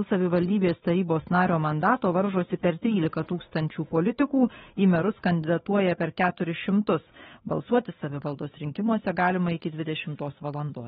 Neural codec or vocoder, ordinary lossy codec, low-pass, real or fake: codec, 16 kHz, 8 kbps, FunCodec, trained on Chinese and English, 25 frames a second; AAC, 16 kbps; 7.2 kHz; fake